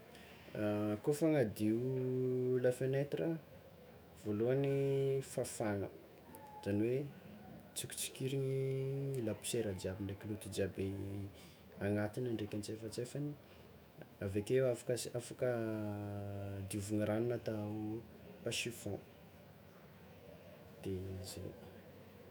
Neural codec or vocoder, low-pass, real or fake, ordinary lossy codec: autoencoder, 48 kHz, 128 numbers a frame, DAC-VAE, trained on Japanese speech; none; fake; none